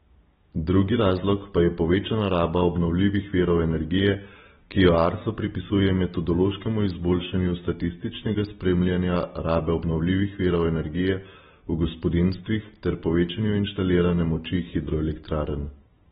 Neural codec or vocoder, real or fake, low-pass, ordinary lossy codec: none; real; 10.8 kHz; AAC, 16 kbps